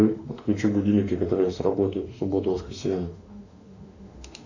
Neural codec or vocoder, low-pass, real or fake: autoencoder, 48 kHz, 32 numbers a frame, DAC-VAE, trained on Japanese speech; 7.2 kHz; fake